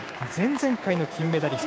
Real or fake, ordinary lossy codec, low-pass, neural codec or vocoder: fake; none; none; codec, 16 kHz, 6 kbps, DAC